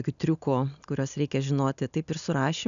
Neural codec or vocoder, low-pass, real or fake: none; 7.2 kHz; real